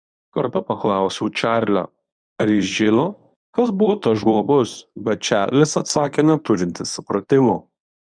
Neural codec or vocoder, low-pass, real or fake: codec, 24 kHz, 0.9 kbps, WavTokenizer, medium speech release version 1; 9.9 kHz; fake